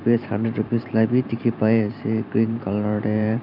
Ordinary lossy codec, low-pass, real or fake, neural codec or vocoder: none; 5.4 kHz; fake; vocoder, 44.1 kHz, 128 mel bands every 512 samples, BigVGAN v2